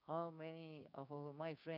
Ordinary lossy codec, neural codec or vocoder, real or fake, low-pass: none; codec, 16 kHz, 6 kbps, DAC; fake; 5.4 kHz